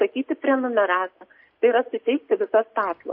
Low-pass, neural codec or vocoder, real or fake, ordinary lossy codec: 5.4 kHz; none; real; MP3, 32 kbps